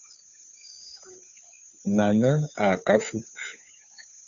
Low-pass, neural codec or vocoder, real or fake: 7.2 kHz; codec, 16 kHz, 2 kbps, FunCodec, trained on Chinese and English, 25 frames a second; fake